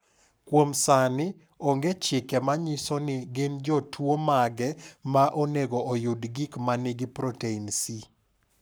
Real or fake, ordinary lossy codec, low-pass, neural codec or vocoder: fake; none; none; codec, 44.1 kHz, 7.8 kbps, Pupu-Codec